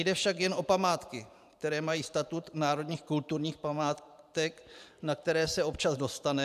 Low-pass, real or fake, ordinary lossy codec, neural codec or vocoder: 14.4 kHz; fake; AAC, 96 kbps; vocoder, 44.1 kHz, 128 mel bands every 512 samples, BigVGAN v2